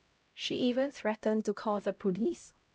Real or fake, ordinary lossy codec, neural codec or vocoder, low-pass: fake; none; codec, 16 kHz, 0.5 kbps, X-Codec, HuBERT features, trained on LibriSpeech; none